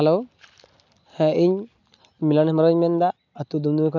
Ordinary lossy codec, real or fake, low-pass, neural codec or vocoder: none; real; 7.2 kHz; none